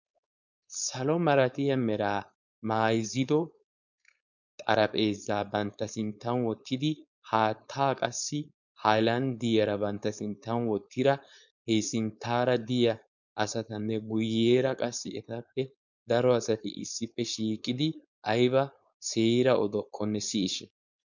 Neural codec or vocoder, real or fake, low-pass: codec, 16 kHz, 4.8 kbps, FACodec; fake; 7.2 kHz